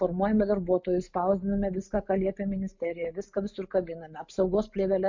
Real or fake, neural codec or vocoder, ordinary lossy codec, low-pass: real; none; MP3, 48 kbps; 7.2 kHz